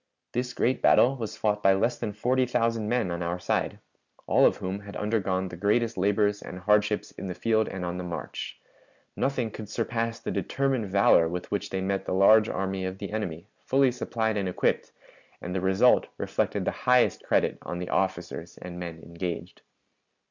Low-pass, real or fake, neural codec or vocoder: 7.2 kHz; real; none